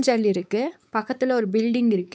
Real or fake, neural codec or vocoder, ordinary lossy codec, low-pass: fake; codec, 16 kHz, 4 kbps, X-Codec, WavLM features, trained on Multilingual LibriSpeech; none; none